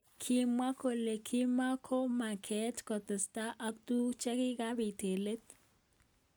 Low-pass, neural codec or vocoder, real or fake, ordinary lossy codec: none; none; real; none